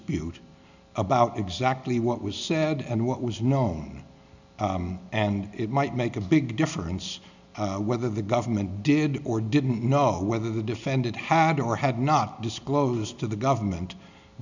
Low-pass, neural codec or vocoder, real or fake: 7.2 kHz; none; real